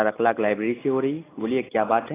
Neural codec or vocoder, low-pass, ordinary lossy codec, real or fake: none; 3.6 kHz; AAC, 16 kbps; real